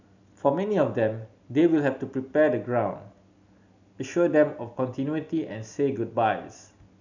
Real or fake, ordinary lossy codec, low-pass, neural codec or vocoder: real; none; 7.2 kHz; none